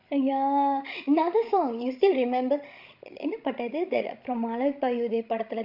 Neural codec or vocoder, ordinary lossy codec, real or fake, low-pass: codec, 16 kHz, 16 kbps, FreqCodec, larger model; MP3, 48 kbps; fake; 5.4 kHz